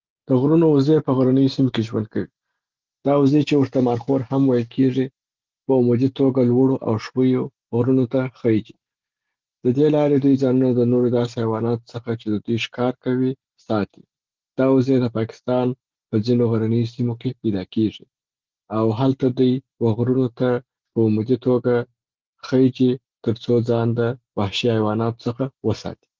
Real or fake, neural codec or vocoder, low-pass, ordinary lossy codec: real; none; 7.2 kHz; Opus, 16 kbps